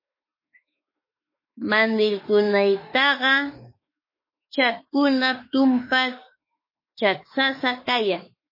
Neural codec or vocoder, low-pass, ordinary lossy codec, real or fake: autoencoder, 48 kHz, 32 numbers a frame, DAC-VAE, trained on Japanese speech; 5.4 kHz; MP3, 24 kbps; fake